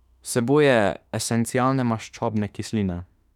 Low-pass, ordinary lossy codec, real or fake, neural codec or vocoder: 19.8 kHz; none; fake; autoencoder, 48 kHz, 32 numbers a frame, DAC-VAE, trained on Japanese speech